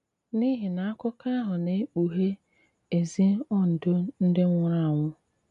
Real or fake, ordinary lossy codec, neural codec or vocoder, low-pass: real; none; none; 10.8 kHz